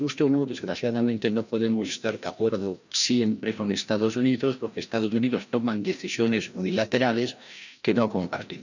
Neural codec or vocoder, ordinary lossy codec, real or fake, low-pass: codec, 16 kHz, 1 kbps, FreqCodec, larger model; none; fake; 7.2 kHz